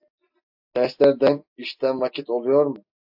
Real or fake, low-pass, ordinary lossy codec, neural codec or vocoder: real; 5.4 kHz; AAC, 48 kbps; none